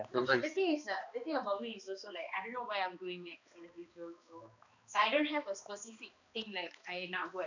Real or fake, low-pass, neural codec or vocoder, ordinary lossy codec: fake; 7.2 kHz; codec, 16 kHz, 2 kbps, X-Codec, HuBERT features, trained on balanced general audio; none